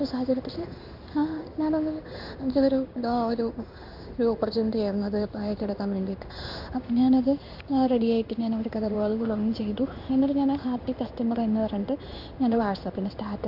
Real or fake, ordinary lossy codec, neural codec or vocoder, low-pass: fake; none; codec, 16 kHz in and 24 kHz out, 1 kbps, XY-Tokenizer; 5.4 kHz